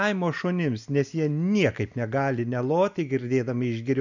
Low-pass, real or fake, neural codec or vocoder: 7.2 kHz; real; none